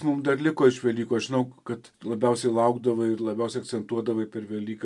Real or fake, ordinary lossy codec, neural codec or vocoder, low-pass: real; MP3, 64 kbps; none; 10.8 kHz